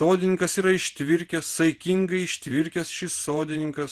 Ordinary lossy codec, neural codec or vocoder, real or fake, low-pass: Opus, 16 kbps; vocoder, 48 kHz, 128 mel bands, Vocos; fake; 14.4 kHz